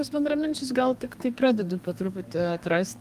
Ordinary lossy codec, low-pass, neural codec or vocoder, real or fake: Opus, 32 kbps; 14.4 kHz; codec, 32 kHz, 1.9 kbps, SNAC; fake